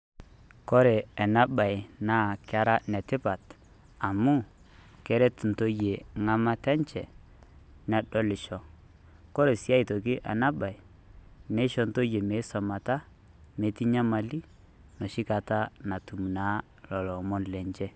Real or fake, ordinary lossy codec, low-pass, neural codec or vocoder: real; none; none; none